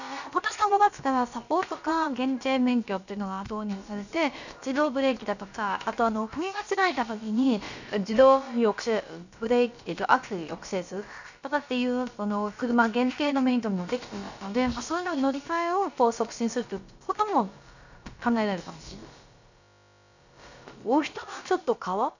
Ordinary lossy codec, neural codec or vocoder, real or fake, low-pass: none; codec, 16 kHz, about 1 kbps, DyCAST, with the encoder's durations; fake; 7.2 kHz